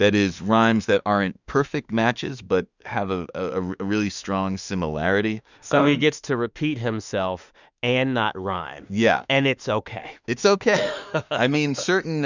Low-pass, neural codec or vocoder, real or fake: 7.2 kHz; autoencoder, 48 kHz, 32 numbers a frame, DAC-VAE, trained on Japanese speech; fake